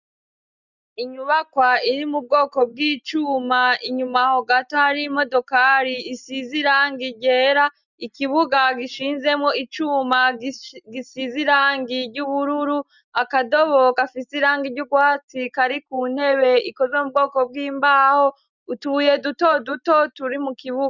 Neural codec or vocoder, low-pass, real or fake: none; 7.2 kHz; real